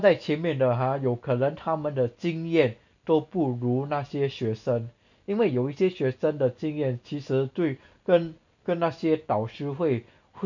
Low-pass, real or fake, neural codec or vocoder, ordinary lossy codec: 7.2 kHz; real; none; none